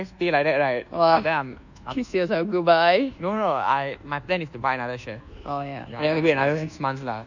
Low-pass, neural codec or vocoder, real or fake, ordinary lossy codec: 7.2 kHz; codec, 24 kHz, 1.2 kbps, DualCodec; fake; none